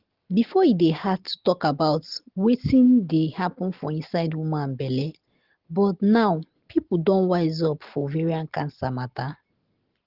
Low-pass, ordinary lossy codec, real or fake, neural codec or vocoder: 5.4 kHz; Opus, 16 kbps; real; none